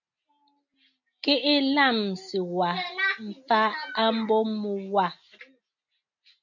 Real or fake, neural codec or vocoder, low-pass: real; none; 7.2 kHz